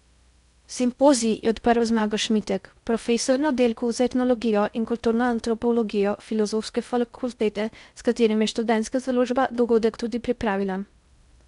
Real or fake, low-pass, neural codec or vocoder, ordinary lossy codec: fake; 10.8 kHz; codec, 16 kHz in and 24 kHz out, 0.6 kbps, FocalCodec, streaming, 4096 codes; none